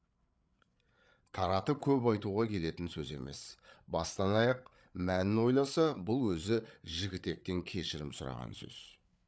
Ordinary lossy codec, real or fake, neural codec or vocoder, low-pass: none; fake; codec, 16 kHz, 8 kbps, FreqCodec, larger model; none